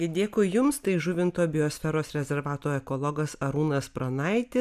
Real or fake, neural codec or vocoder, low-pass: real; none; 14.4 kHz